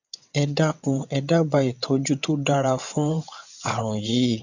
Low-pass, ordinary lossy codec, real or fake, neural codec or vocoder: 7.2 kHz; none; fake; vocoder, 22.05 kHz, 80 mel bands, WaveNeXt